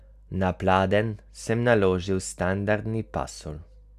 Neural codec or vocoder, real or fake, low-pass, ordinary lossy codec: none; real; 14.4 kHz; none